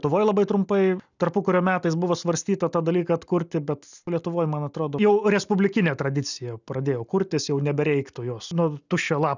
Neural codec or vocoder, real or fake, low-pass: none; real; 7.2 kHz